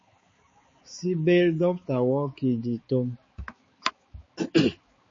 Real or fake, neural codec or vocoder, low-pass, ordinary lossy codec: fake; codec, 16 kHz, 4 kbps, X-Codec, HuBERT features, trained on balanced general audio; 7.2 kHz; MP3, 32 kbps